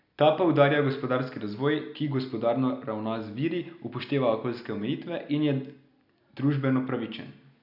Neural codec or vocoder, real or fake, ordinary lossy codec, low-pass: none; real; none; 5.4 kHz